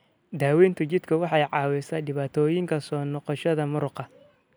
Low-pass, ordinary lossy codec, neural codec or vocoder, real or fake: none; none; none; real